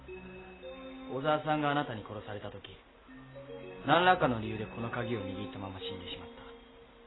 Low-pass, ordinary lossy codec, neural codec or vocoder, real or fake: 7.2 kHz; AAC, 16 kbps; none; real